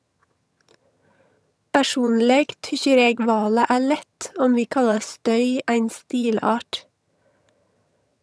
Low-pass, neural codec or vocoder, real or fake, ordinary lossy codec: none; vocoder, 22.05 kHz, 80 mel bands, HiFi-GAN; fake; none